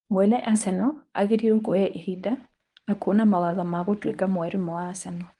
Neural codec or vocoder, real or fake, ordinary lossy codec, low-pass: codec, 24 kHz, 0.9 kbps, WavTokenizer, medium speech release version 1; fake; Opus, 32 kbps; 10.8 kHz